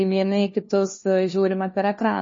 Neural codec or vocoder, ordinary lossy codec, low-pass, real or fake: codec, 16 kHz, 1 kbps, FunCodec, trained on LibriTTS, 50 frames a second; MP3, 32 kbps; 7.2 kHz; fake